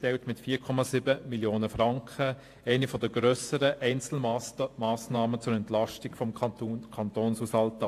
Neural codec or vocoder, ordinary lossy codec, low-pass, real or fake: vocoder, 48 kHz, 128 mel bands, Vocos; AAC, 64 kbps; 14.4 kHz; fake